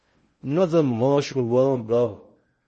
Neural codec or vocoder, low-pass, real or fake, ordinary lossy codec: codec, 16 kHz in and 24 kHz out, 0.6 kbps, FocalCodec, streaming, 2048 codes; 10.8 kHz; fake; MP3, 32 kbps